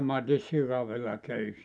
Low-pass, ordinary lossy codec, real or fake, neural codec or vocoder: none; none; real; none